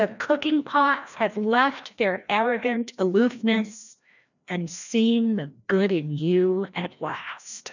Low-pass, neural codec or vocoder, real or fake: 7.2 kHz; codec, 16 kHz, 1 kbps, FreqCodec, larger model; fake